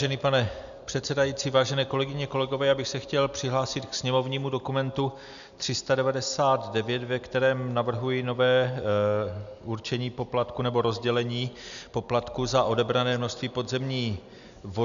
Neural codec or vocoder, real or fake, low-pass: none; real; 7.2 kHz